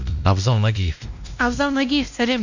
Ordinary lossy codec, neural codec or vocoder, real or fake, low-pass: none; codec, 16 kHz, 1 kbps, X-Codec, WavLM features, trained on Multilingual LibriSpeech; fake; 7.2 kHz